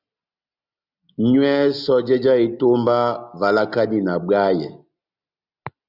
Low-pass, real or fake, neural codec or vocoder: 5.4 kHz; real; none